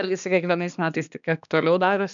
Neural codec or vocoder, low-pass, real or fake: codec, 16 kHz, 2 kbps, X-Codec, HuBERT features, trained on balanced general audio; 7.2 kHz; fake